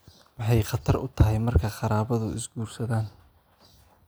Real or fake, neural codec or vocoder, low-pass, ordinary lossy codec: real; none; none; none